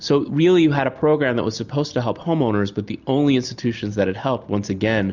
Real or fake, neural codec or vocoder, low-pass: real; none; 7.2 kHz